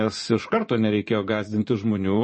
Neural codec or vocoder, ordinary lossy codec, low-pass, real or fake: vocoder, 24 kHz, 100 mel bands, Vocos; MP3, 32 kbps; 10.8 kHz; fake